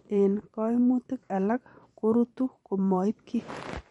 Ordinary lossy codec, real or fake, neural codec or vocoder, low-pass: MP3, 48 kbps; fake; vocoder, 44.1 kHz, 128 mel bands every 512 samples, BigVGAN v2; 19.8 kHz